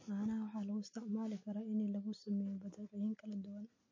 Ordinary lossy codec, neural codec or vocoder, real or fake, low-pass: MP3, 32 kbps; none; real; 7.2 kHz